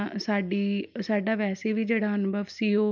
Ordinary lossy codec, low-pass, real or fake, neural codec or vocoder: none; 7.2 kHz; real; none